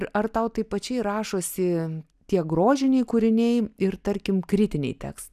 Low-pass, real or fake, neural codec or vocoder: 14.4 kHz; real; none